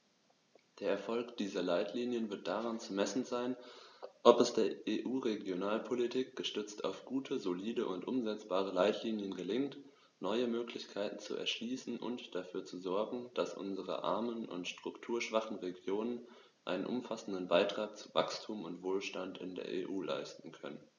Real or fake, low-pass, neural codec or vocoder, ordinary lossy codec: real; 7.2 kHz; none; none